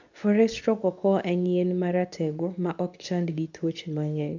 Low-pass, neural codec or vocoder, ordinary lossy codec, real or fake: 7.2 kHz; codec, 24 kHz, 0.9 kbps, WavTokenizer, medium speech release version 2; MP3, 64 kbps; fake